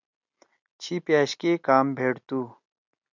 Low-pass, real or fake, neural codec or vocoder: 7.2 kHz; real; none